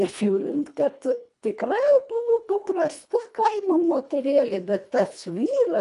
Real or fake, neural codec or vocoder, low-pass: fake; codec, 24 kHz, 1.5 kbps, HILCodec; 10.8 kHz